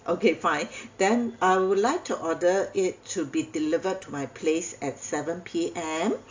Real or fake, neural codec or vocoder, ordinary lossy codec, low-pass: real; none; AAC, 48 kbps; 7.2 kHz